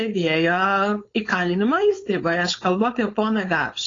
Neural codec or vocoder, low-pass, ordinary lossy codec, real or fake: codec, 16 kHz, 4.8 kbps, FACodec; 7.2 kHz; AAC, 32 kbps; fake